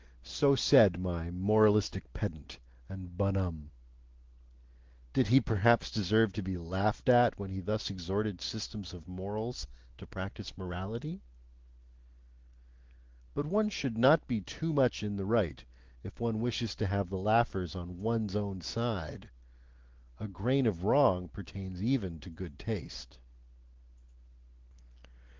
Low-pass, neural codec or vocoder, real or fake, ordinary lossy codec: 7.2 kHz; none; real; Opus, 16 kbps